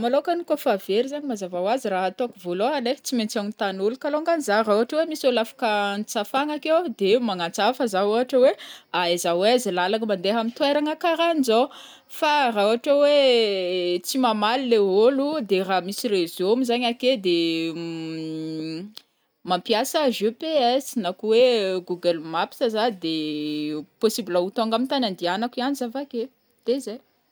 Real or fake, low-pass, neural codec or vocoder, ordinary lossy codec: real; none; none; none